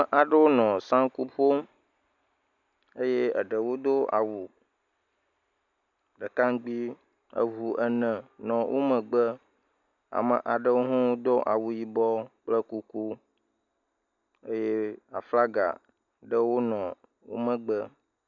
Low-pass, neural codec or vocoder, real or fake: 7.2 kHz; none; real